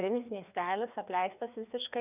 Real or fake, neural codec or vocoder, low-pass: fake; codec, 16 kHz, 2 kbps, FreqCodec, larger model; 3.6 kHz